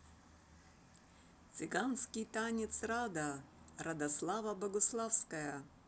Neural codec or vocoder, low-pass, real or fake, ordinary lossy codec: none; none; real; none